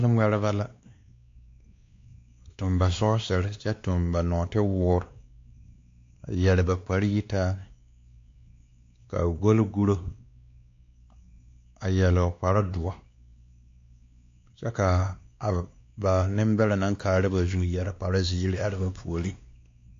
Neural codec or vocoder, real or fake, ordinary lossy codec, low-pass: codec, 16 kHz, 2 kbps, X-Codec, WavLM features, trained on Multilingual LibriSpeech; fake; AAC, 48 kbps; 7.2 kHz